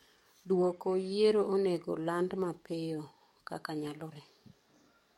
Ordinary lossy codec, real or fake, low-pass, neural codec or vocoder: MP3, 64 kbps; fake; 19.8 kHz; codec, 44.1 kHz, 7.8 kbps, DAC